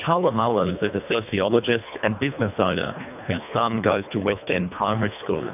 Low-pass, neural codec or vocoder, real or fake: 3.6 kHz; codec, 24 kHz, 1.5 kbps, HILCodec; fake